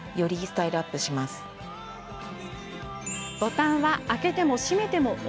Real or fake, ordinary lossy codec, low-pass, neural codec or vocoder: real; none; none; none